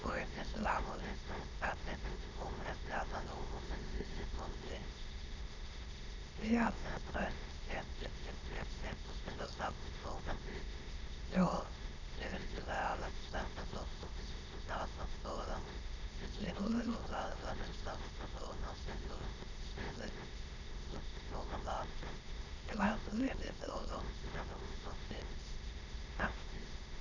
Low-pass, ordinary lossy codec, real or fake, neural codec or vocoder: 7.2 kHz; none; fake; autoencoder, 22.05 kHz, a latent of 192 numbers a frame, VITS, trained on many speakers